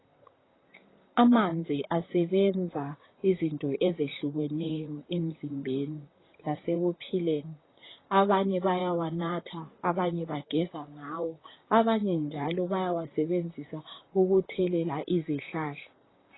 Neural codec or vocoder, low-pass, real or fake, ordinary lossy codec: vocoder, 44.1 kHz, 128 mel bands, Pupu-Vocoder; 7.2 kHz; fake; AAC, 16 kbps